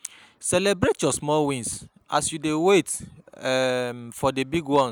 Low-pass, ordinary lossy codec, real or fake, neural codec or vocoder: none; none; real; none